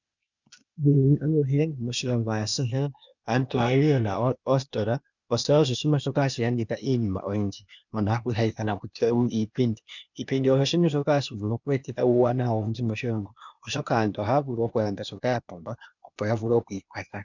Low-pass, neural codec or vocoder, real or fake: 7.2 kHz; codec, 16 kHz, 0.8 kbps, ZipCodec; fake